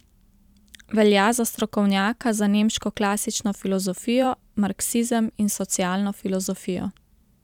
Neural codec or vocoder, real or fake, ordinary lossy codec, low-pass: vocoder, 44.1 kHz, 128 mel bands every 512 samples, BigVGAN v2; fake; none; 19.8 kHz